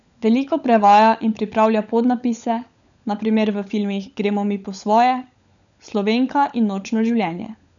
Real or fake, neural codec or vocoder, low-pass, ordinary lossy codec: fake; codec, 16 kHz, 16 kbps, FunCodec, trained on LibriTTS, 50 frames a second; 7.2 kHz; none